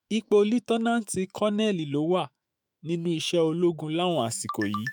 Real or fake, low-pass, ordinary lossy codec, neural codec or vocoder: fake; none; none; autoencoder, 48 kHz, 128 numbers a frame, DAC-VAE, trained on Japanese speech